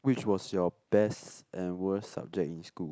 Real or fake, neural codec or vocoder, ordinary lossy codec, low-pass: real; none; none; none